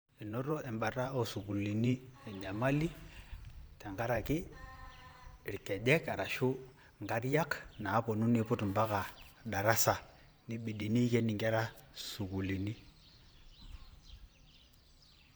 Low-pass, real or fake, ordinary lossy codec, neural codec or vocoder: none; fake; none; vocoder, 44.1 kHz, 128 mel bands every 512 samples, BigVGAN v2